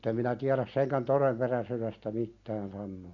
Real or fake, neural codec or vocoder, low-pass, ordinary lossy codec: real; none; 7.2 kHz; none